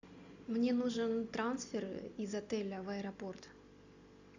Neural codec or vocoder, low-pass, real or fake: none; 7.2 kHz; real